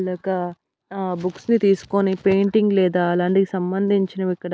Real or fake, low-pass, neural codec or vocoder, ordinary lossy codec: real; none; none; none